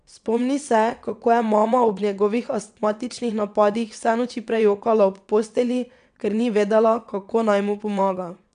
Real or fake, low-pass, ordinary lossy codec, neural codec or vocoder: fake; 9.9 kHz; none; vocoder, 22.05 kHz, 80 mel bands, WaveNeXt